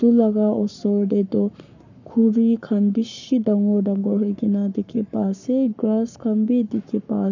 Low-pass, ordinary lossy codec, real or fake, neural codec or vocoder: 7.2 kHz; none; fake; codec, 44.1 kHz, 7.8 kbps, Pupu-Codec